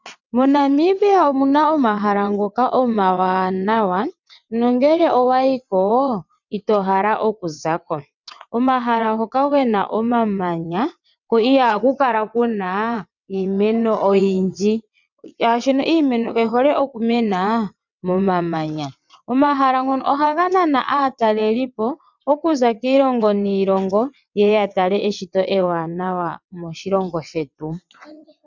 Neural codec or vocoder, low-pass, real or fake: vocoder, 22.05 kHz, 80 mel bands, WaveNeXt; 7.2 kHz; fake